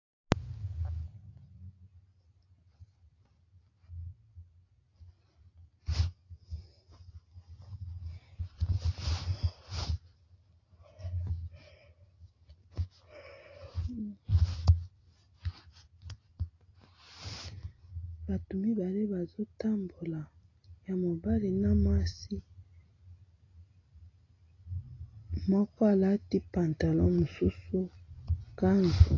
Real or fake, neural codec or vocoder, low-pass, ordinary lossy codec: real; none; 7.2 kHz; AAC, 32 kbps